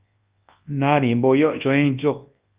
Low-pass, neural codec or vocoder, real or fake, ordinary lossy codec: 3.6 kHz; codec, 24 kHz, 0.9 kbps, WavTokenizer, large speech release; fake; Opus, 32 kbps